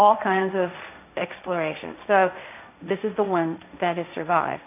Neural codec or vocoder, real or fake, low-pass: codec, 16 kHz, 1.1 kbps, Voila-Tokenizer; fake; 3.6 kHz